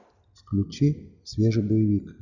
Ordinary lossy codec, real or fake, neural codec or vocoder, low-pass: Opus, 64 kbps; real; none; 7.2 kHz